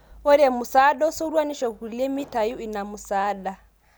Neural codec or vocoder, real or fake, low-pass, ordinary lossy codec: none; real; none; none